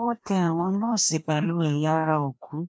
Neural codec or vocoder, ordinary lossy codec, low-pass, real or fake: codec, 16 kHz, 1 kbps, FreqCodec, larger model; none; none; fake